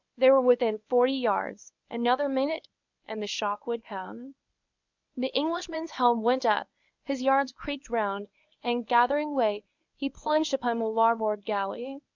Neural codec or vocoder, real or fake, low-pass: codec, 24 kHz, 0.9 kbps, WavTokenizer, medium speech release version 1; fake; 7.2 kHz